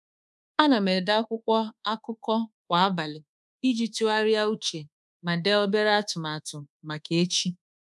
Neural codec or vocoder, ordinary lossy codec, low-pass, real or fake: codec, 24 kHz, 1.2 kbps, DualCodec; none; none; fake